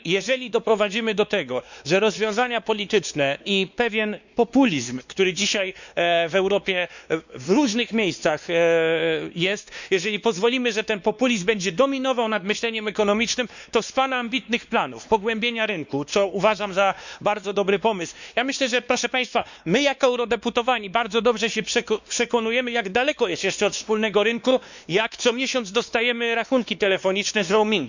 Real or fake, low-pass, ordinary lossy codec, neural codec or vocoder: fake; 7.2 kHz; none; codec, 16 kHz, 2 kbps, X-Codec, WavLM features, trained on Multilingual LibriSpeech